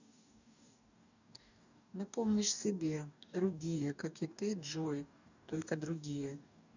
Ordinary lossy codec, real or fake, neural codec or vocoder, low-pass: none; fake; codec, 44.1 kHz, 2.6 kbps, DAC; 7.2 kHz